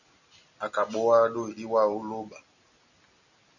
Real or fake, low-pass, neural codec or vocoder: real; 7.2 kHz; none